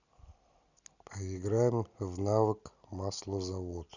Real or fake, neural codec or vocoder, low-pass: real; none; 7.2 kHz